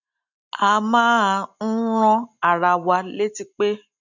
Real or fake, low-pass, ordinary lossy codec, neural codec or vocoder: real; 7.2 kHz; none; none